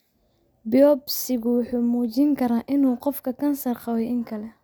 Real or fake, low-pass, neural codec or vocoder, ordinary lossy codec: real; none; none; none